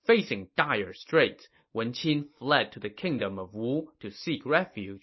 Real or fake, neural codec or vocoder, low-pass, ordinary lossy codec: real; none; 7.2 kHz; MP3, 24 kbps